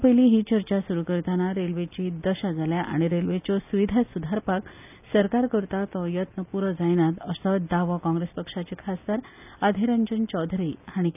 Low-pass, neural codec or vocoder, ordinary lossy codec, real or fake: 3.6 kHz; none; none; real